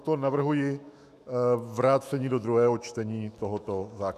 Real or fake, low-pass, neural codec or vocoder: fake; 14.4 kHz; autoencoder, 48 kHz, 128 numbers a frame, DAC-VAE, trained on Japanese speech